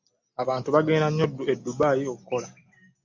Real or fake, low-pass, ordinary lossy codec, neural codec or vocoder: real; 7.2 kHz; MP3, 64 kbps; none